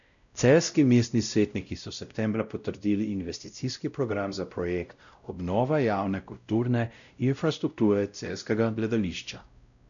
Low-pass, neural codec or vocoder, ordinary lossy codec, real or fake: 7.2 kHz; codec, 16 kHz, 0.5 kbps, X-Codec, WavLM features, trained on Multilingual LibriSpeech; none; fake